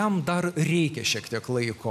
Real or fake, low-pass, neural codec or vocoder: real; 14.4 kHz; none